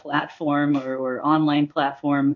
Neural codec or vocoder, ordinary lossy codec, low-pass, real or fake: codec, 16 kHz in and 24 kHz out, 1 kbps, XY-Tokenizer; MP3, 48 kbps; 7.2 kHz; fake